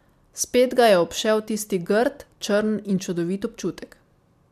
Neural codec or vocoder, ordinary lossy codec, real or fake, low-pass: none; MP3, 96 kbps; real; 14.4 kHz